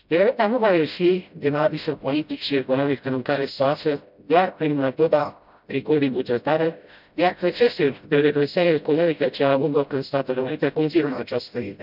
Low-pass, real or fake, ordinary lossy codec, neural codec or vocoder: 5.4 kHz; fake; none; codec, 16 kHz, 0.5 kbps, FreqCodec, smaller model